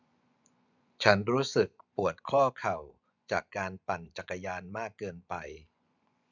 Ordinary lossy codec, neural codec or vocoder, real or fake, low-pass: none; vocoder, 24 kHz, 100 mel bands, Vocos; fake; 7.2 kHz